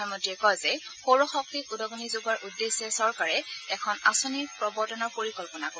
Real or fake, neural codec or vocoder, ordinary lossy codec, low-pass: real; none; none; none